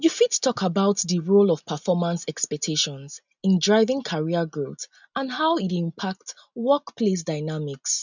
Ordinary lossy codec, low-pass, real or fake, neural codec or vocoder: none; 7.2 kHz; real; none